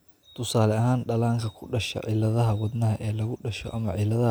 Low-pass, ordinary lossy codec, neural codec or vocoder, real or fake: none; none; none; real